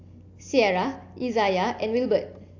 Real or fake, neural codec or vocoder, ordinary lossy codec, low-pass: real; none; AAC, 48 kbps; 7.2 kHz